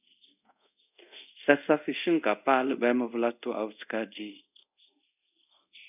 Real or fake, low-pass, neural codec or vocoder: fake; 3.6 kHz; codec, 24 kHz, 0.5 kbps, DualCodec